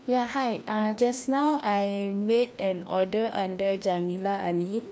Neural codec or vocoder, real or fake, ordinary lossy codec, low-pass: codec, 16 kHz, 1 kbps, FreqCodec, larger model; fake; none; none